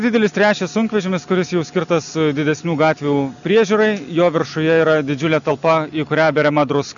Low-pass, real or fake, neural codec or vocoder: 7.2 kHz; real; none